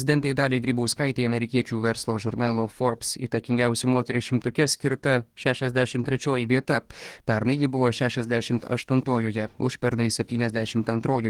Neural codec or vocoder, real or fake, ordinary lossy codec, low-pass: codec, 44.1 kHz, 2.6 kbps, DAC; fake; Opus, 24 kbps; 19.8 kHz